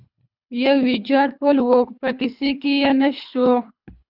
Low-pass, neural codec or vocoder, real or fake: 5.4 kHz; codec, 24 kHz, 3 kbps, HILCodec; fake